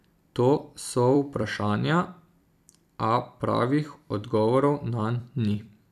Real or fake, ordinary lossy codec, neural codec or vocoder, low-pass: fake; none; vocoder, 44.1 kHz, 128 mel bands every 256 samples, BigVGAN v2; 14.4 kHz